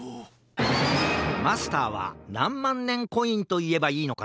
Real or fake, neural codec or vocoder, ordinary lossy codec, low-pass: real; none; none; none